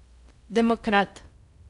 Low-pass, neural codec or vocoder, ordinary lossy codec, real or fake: 10.8 kHz; codec, 16 kHz in and 24 kHz out, 0.6 kbps, FocalCodec, streaming, 4096 codes; none; fake